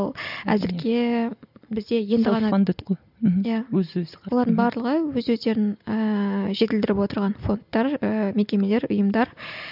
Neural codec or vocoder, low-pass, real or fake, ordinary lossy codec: none; 5.4 kHz; real; none